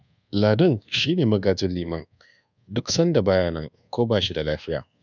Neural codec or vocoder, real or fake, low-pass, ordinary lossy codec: codec, 24 kHz, 1.2 kbps, DualCodec; fake; 7.2 kHz; none